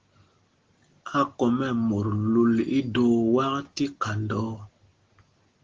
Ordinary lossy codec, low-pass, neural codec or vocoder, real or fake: Opus, 16 kbps; 7.2 kHz; none; real